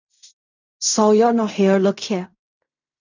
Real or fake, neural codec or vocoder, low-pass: fake; codec, 16 kHz in and 24 kHz out, 0.4 kbps, LongCat-Audio-Codec, fine tuned four codebook decoder; 7.2 kHz